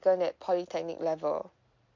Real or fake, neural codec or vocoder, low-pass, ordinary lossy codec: real; none; 7.2 kHz; MP3, 48 kbps